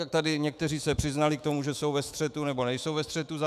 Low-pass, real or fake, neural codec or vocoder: 14.4 kHz; fake; autoencoder, 48 kHz, 128 numbers a frame, DAC-VAE, trained on Japanese speech